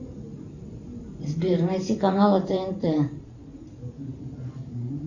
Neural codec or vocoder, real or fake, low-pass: vocoder, 44.1 kHz, 128 mel bands every 512 samples, BigVGAN v2; fake; 7.2 kHz